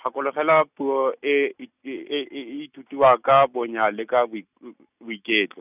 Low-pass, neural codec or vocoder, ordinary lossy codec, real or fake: 3.6 kHz; none; none; real